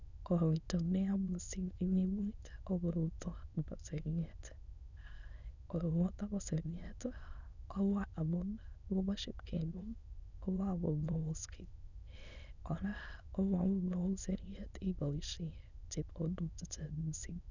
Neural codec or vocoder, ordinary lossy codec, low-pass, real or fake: autoencoder, 22.05 kHz, a latent of 192 numbers a frame, VITS, trained on many speakers; none; 7.2 kHz; fake